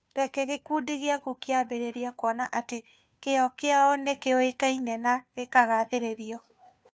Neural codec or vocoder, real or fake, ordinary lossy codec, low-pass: codec, 16 kHz, 2 kbps, FunCodec, trained on Chinese and English, 25 frames a second; fake; none; none